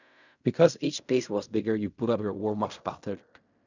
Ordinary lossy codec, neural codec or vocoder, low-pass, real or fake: none; codec, 16 kHz in and 24 kHz out, 0.4 kbps, LongCat-Audio-Codec, fine tuned four codebook decoder; 7.2 kHz; fake